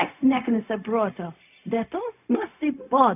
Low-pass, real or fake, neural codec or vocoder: 3.6 kHz; fake; codec, 16 kHz, 0.4 kbps, LongCat-Audio-Codec